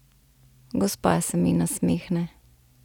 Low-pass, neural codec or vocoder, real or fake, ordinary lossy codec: 19.8 kHz; none; real; none